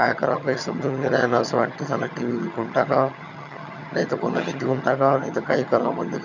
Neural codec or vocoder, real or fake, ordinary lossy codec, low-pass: vocoder, 22.05 kHz, 80 mel bands, HiFi-GAN; fake; none; 7.2 kHz